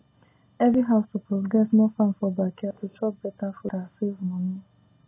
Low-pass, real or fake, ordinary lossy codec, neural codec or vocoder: 3.6 kHz; real; AAC, 16 kbps; none